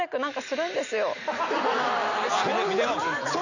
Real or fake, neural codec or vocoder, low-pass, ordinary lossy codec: real; none; 7.2 kHz; none